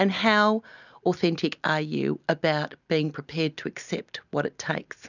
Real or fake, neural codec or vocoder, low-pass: real; none; 7.2 kHz